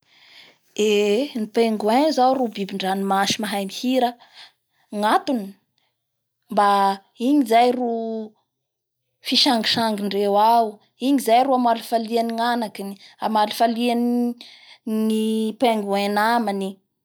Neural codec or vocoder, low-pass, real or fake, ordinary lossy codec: none; none; real; none